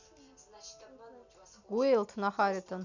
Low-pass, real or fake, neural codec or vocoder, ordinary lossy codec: 7.2 kHz; real; none; none